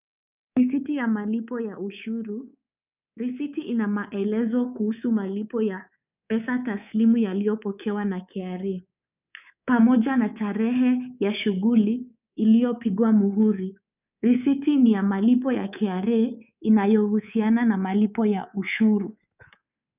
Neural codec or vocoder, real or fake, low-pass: codec, 24 kHz, 3.1 kbps, DualCodec; fake; 3.6 kHz